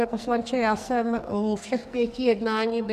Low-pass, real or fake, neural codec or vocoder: 14.4 kHz; fake; codec, 44.1 kHz, 2.6 kbps, SNAC